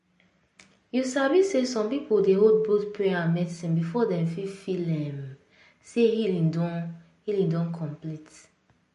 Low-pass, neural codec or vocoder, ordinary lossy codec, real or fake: 14.4 kHz; none; MP3, 48 kbps; real